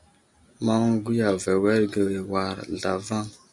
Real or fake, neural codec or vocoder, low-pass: real; none; 10.8 kHz